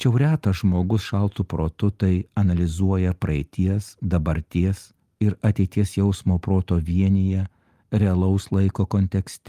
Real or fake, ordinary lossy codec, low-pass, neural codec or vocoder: real; Opus, 32 kbps; 14.4 kHz; none